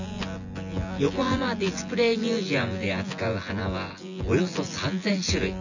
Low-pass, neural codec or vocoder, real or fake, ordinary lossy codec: 7.2 kHz; vocoder, 24 kHz, 100 mel bands, Vocos; fake; none